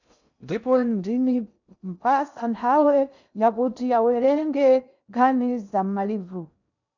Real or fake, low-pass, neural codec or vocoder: fake; 7.2 kHz; codec, 16 kHz in and 24 kHz out, 0.6 kbps, FocalCodec, streaming, 2048 codes